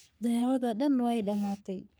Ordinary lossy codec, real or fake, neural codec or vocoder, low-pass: none; fake; codec, 44.1 kHz, 3.4 kbps, Pupu-Codec; none